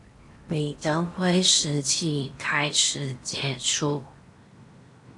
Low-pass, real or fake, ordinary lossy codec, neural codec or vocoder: 10.8 kHz; fake; AAC, 64 kbps; codec, 16 kHz in and 24 kHz out, 0.8 kbps, FocalCodec, streaming, 65536 codes